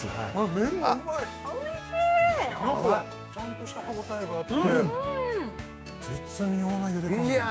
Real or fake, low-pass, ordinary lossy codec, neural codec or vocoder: fake; none; none; codec, 16 kHz, 6 kbps, DAC